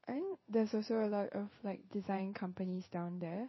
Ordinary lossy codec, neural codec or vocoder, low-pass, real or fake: MP3, 24 kbps; codec, 16 kHz in and 24 kHz out, 1 kbps, XY-Tokenizer; 7.2 kHz; fake